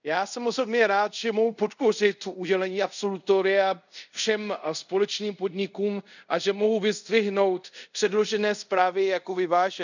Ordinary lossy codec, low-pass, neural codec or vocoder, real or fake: none; 7.2 kHz; codec, 24 kHz, 0.5 kbps, DualCodec; fake